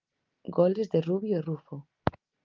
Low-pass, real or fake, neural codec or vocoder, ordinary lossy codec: 7.2 kHz; real; none; Opus, 32 kbps